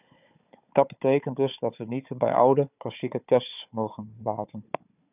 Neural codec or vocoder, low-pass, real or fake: codec, 16 kHz, 4 kbps, FunCodec, trained on Chinese and English, 50 frames a second; 3.6 kHz; fake